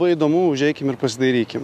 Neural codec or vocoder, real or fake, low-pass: none; real; 14.4 kHz